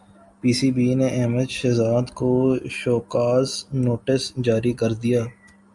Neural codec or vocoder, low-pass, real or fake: none; 10.8 kHz; real